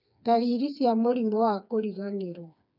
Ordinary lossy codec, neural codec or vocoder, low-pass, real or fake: none; codec, 16 kHz, 4 kbps, FreqCodec, smaller model; 5.4 kHz; fake